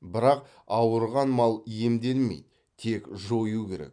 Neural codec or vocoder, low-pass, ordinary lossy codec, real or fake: none; 9.9 kHz; none; real